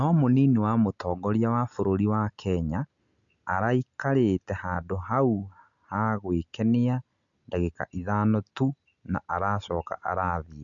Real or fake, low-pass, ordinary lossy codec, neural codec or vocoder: real; 7.2 kHz; none; none